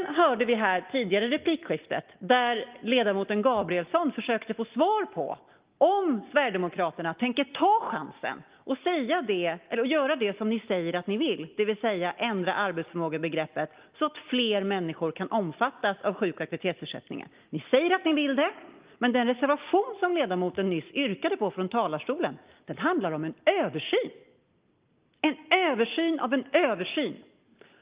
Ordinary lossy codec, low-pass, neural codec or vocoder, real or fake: Opus, 32 kbps; 3.6 kHz; none; real